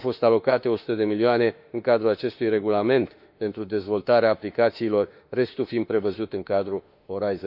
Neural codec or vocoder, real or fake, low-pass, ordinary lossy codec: autoencoder, 48 kHz, 32 numbers a frame, DAC-VAE, trained on Japanese speech; fake; 5.4 kHz; none